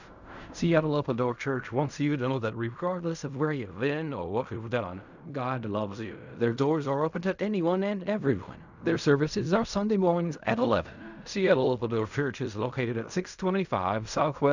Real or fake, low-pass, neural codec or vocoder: fake; 7.2 kHz; codec, 16 kHz in and 24 kHz out, 0.4 kbps, LongCat-Audio-Codec, fine tuned four codebook decoder